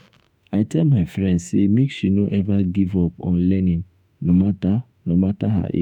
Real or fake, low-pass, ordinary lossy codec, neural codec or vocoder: fake; 19.8 kHz; none; autoencoder, 48 kHz, 32 numbers a frame, DAC-VAE, trained on Japanese speech